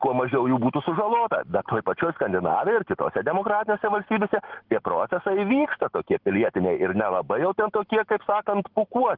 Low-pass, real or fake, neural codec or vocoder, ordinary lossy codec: 5.4 kHz; real; none; Opus, 16 kbps